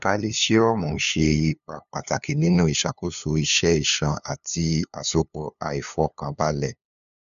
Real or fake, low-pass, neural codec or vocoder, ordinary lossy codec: fake; 7.2 kHz; codec, 16 kHz, 2 kbps, FunCodec, trained on LibriTTS, 25 frames a second; none